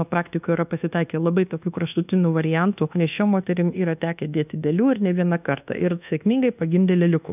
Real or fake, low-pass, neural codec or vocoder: fake; 3.6 kHz; codec, 24 kHz, 1.2 kbps, DualCodec